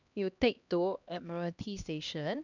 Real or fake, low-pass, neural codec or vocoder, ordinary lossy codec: fake; 7.2 kHz; codec, 16 kHz, 1 kbps, X-Codec, HuBERT features, trained on LibriSpeech; none